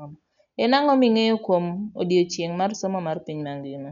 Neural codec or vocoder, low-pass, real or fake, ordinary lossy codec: none; 7.2 kHz; real; none